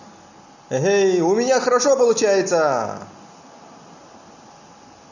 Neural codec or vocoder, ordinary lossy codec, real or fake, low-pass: none; none; real; 7.2 kHz